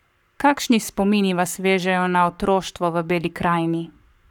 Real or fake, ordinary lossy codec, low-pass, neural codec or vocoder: fake; none; 19.8 kHz; codec, 44.1 kHz, 7.8 kbps, Pupu-Codec